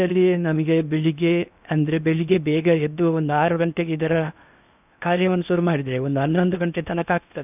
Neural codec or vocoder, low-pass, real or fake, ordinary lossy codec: codec, 16 kHz in and 24 kHz out, 0.8 kbps, FocalCodec, streaming, 65536 codes; 3.6 kHz; fake; none